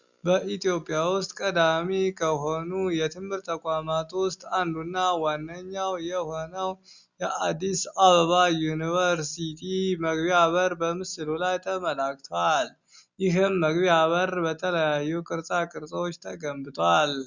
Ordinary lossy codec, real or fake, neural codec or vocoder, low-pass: Opus, 64 kbps; real; none; 7.2 kHz